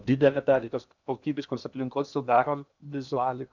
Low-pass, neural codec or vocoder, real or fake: 7.2 kHz; codec, 16 kHz in and 24 kHz out, 0.8 kbps, FocalCodec, streaming, 65536 codes; fake